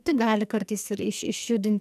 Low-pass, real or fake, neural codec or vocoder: 14.4 kHz; fake; codec, 44.1 kHz, 2.6 kbps, SNAC